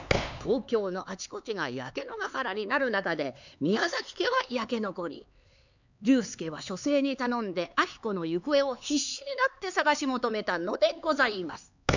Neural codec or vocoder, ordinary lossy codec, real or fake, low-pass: codec, 16 kHz, 4 kbps, X-Codec, HuBERT features, trained on LibriSpeech; none; fake; 7.2 kHz